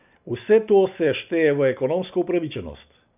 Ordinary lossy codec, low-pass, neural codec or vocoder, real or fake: none; 3.6 kHz; none; real